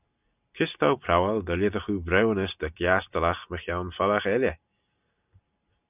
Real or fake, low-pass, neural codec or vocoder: real; 3.6 kHz; none